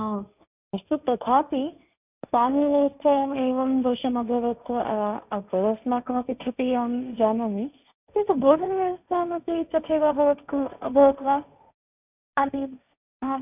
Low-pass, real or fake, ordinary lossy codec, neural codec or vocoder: 3.6 kHz; fake; none; codec, 16 kHz, 1.1 kbps, Voila-Tokenizer